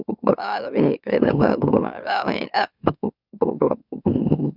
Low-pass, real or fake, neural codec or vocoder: 5.4 kHz; fake; autoencoder, 44.1 kHz, a latent of 192 numbers a frame, MeloTTS